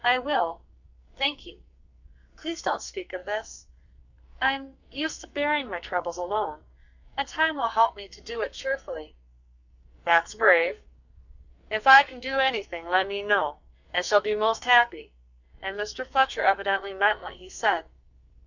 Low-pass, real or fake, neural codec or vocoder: 7.2 kHz; fake; codec, 44.1 kHz, 2.6 kbps, SNAC